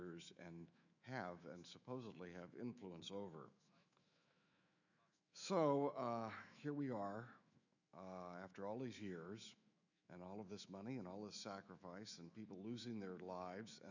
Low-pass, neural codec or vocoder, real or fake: 7.2 kHz; autoencoder, 48 kHz, 128 numbers a frame, DAC-VAE, trained on Japanese speech; fake